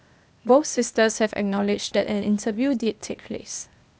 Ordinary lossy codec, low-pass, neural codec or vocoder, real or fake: none; none; codec, 16 kHz, 0.8 kbps, ZipCodec; fake